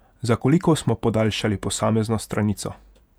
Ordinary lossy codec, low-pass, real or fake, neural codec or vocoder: none; 19.8 kHz; fake; vocoder, 48 kHz, 128 mel bands, Vocos